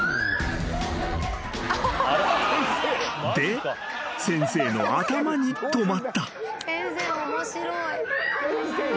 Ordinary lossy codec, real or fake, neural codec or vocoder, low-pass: none; real; none; none